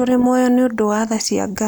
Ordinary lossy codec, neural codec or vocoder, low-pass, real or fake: none; none; none; real